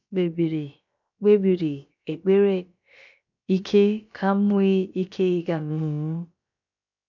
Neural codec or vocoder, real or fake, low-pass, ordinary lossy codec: codec, 16 kHz, about 1 kbps, DyCAST, with the encoder's durations; fake; 7.2 kHz; none